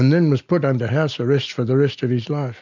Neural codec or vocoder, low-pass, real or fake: none; 7.2 kHz; real